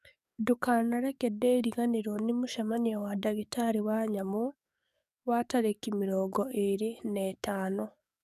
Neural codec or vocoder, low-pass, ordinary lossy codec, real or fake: codec, 44.1 kHz, 7.8 kbps, DAC; 14.4 kHz; none; fake